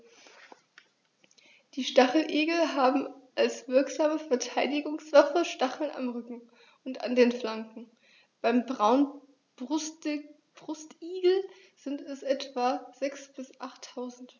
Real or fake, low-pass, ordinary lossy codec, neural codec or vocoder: real; 7.2 kHz; none; none